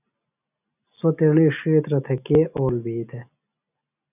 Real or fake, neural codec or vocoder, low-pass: real; none; 3.6 kHz